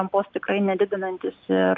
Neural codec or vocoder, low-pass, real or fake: none; 7.2 kHz; real